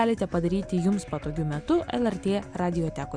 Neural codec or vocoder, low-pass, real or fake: none; 9.9 kHz; real